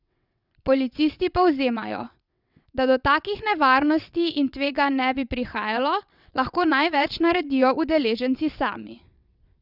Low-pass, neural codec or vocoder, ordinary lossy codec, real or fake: 5.4 kHz; vocoder, 22.05 kHz, 80 mel bands, WaveNeXt; none; fake